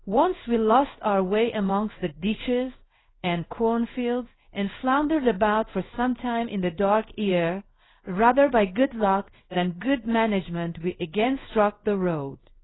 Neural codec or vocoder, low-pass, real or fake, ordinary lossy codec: codec, 16 kHz in and 24 kHz out, 1 kbps, XY-Tokenizer; 7.2 kHz; fake; AAC, 16 kbps